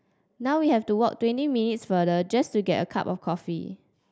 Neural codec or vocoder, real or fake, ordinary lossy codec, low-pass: none; real; none; none